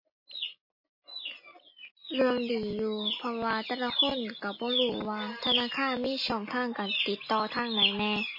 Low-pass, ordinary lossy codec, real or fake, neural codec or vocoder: 5.4 kHz; MP3, 24 kbps; real; none